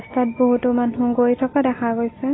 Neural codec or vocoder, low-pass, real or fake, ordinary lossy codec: none; 7.2 kHz; real; AAC, 16 kbps